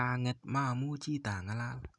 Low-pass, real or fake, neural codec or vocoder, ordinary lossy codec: 10.8 kHz; real; none; none